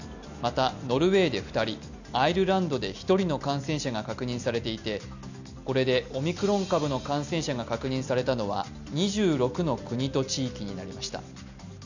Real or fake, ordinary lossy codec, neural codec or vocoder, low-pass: real; none; none; 7.2 kHz